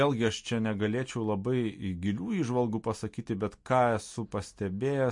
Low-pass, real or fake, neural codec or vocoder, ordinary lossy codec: 10.8 kHz; real; none; MP3, 48 kbps